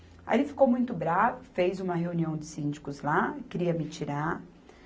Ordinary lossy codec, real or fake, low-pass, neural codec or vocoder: none; real; none; none